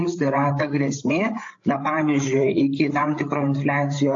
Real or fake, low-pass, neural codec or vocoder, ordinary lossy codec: fake; 7.2 kHz; codec, 16 kHz, 8 kbps, FreqCodec, larger model; AAC, 48 kbps